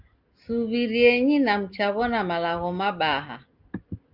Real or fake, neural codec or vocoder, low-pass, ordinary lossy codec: real; none; 5.4 kHz; Opus, 32 kbps